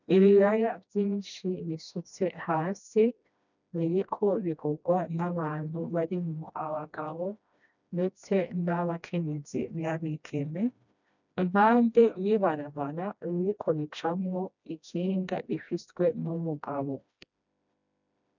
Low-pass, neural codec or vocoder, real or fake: 7.2 kHz; codec, 16 kHz, 1 kbps, FreqCodec, smaller model; fake